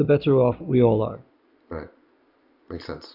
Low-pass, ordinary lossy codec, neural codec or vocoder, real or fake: 5.4 kHz; Opus, 64 kbps; none; real